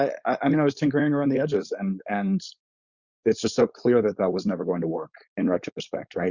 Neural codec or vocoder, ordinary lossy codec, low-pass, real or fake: codec, 16 kHz, 4.8 kbps, FACodec; Opus, 64 kbps; 7.2 kHz; fake